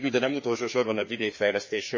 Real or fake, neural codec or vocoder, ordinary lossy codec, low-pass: fake; codec, 16 kHz, 2 kbps, FreqCodec, larger model; MP3, 32 kbps; 7.2 kHz